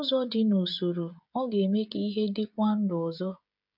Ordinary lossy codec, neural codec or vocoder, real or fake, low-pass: none; codec, 16 kHz, 16 kbps, FreqCodec, smaller model; fake; 5.4 kHz